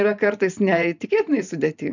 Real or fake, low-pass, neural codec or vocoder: fake; 7.2 kHz; vocoder, 44.1 kHz, 128 mel bands every 512 samples, BigVGAN v2